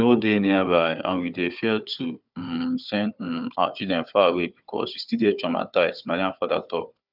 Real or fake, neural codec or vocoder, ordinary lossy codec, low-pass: fake; codec, 16 kHz, 4 kbps, FunCodec, trained on Chinese and English, 50 frames a second; none; 5.4 kHz